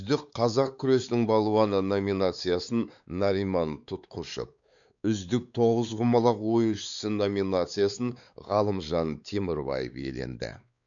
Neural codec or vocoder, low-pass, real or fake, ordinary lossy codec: codec, 16 kHz, 4 kbps, X-Codec, WavLM features, trained on Multilingual LibriSpeech; 7.2 kHz; fake; none